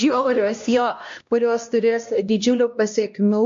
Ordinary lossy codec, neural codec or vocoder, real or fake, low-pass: MP3, 48 kbps; codec, 16 kHz, 1 kbps, X-Codec, HuBERT features, trained on LibriSpeech; fake; 7.2 kHz